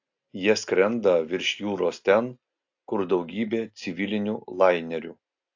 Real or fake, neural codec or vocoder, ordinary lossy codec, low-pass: real; none; AAC, 48 kbps; 7.2 kHz